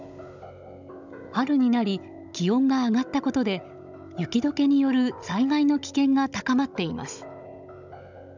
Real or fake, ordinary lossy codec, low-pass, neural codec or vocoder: fake; none; 7.2 kHz; codec, 16 kHz, 16 kbps, FunCodec, trained on Chinese and English, 50 frames a second